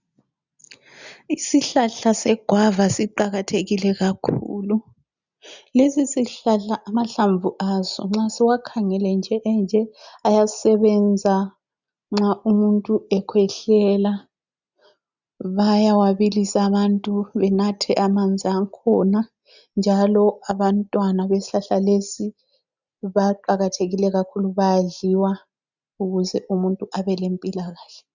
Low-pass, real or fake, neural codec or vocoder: 7.2 kHz; real; none